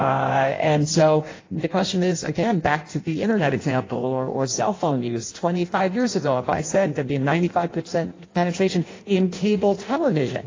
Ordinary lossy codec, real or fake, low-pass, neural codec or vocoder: AAC, 32 kbps; fake; 7.2 kHz; codec, 16 kHz in and 24 kHz out, 0.6 kbps, FireRedTTS-2 codec